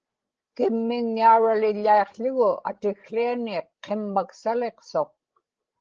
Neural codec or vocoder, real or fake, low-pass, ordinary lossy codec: codec, 16 kHz, 16 kbps, FreqCodec, larger model; fake; 7.2 kHz; Opus, 16 kbps